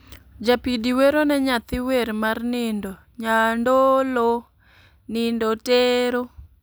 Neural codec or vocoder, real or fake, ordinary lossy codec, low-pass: none; real; none; none